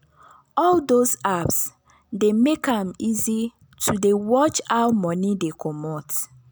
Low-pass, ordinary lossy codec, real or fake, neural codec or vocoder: none; none; real; none